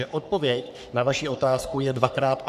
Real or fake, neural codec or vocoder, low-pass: fake; codec, 44.1 kHz, 3.4 kbps, Pupu-Codec; 14.4 kHz